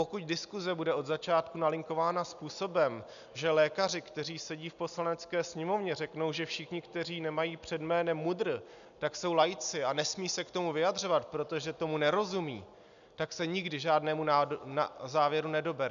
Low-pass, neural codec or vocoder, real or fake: 7.2 kHz; none; real